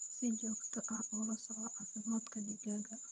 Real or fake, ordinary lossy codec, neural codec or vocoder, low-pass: fake; none; vocoder, 22.05 kHz, 80 mel bands, HiFi-GAN; none